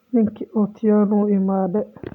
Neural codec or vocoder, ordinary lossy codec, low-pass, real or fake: none; MP3, 96 kbps; 19.8 kHz; real